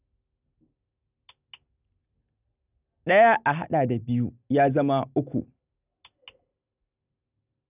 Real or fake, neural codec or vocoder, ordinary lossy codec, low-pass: real; none; none; 3.6 kHz